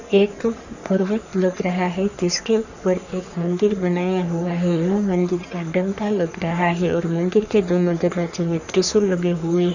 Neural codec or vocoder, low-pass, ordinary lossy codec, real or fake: codec, 44.1 kHz, 3.4 kbps, Pupu-Codec; 7.2 kHz; none; fake